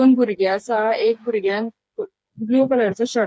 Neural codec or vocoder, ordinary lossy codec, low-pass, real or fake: codec, 16 kHz, 4 kbps, FreqCodec, smaller model; none; none; fake